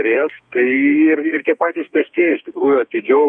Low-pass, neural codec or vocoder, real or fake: 9.9 kHz; codec, 32 kHz, 1.9 kbps, SNAC; fake